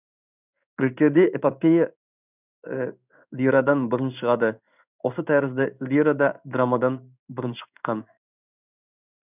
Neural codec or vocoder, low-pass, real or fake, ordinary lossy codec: codec, 16 kHz in and 24 kHz out, 1 kbps, XY-Tokenizer; 3.6 kHz; fake; none